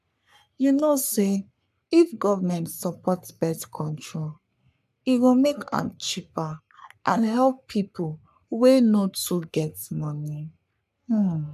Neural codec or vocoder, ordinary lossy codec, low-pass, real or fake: codec, 44.1 kHz, 3.4 kbps, Pupu-Codec; none; 14.4 kHz; fake